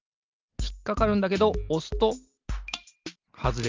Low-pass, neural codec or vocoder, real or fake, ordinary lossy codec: 7.2 kHz; none; real; Opus, 32 kbps